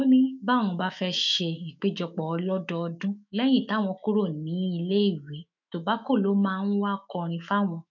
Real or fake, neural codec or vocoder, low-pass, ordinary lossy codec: fake; autoencoder, 48 kHz, 128 numbers a frame, DAC-VAE, trained on Japanese speech; 7.2 kHz; MP3, 64 kbps